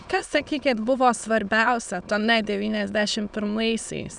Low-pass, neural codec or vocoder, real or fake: 9.9 kHz; autoencoder, 22.05 kHz, a latent of 192 numbers a frame, VITS, trained on many speakers; fake